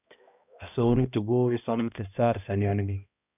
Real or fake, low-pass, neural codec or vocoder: fake; 3.6 kHz; codec, 16 kHz, 1 kbps, X-Codec, HuBERT features, trained on balanced general audio